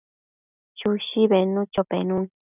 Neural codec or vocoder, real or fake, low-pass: none; real; 3.6 kHz